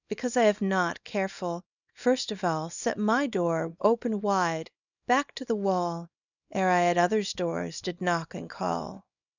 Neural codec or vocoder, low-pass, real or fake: codec, 24 kHz, 0.9 kbps, WavTokenizer, small release; 7.2 kHz; fake